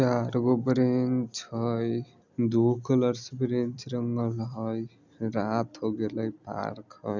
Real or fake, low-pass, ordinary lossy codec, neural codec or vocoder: real; 7.2 kHz; none; none